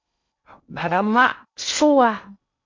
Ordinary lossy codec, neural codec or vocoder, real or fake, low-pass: MP3, 48 kbps; codec, 16 kHz in and 24 kHz out, 0.6 kbps, FocalCodec, streaming, 2048 codes; fake; 7.2 kHz